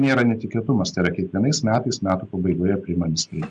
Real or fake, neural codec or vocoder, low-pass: real; none; 9.9 kHz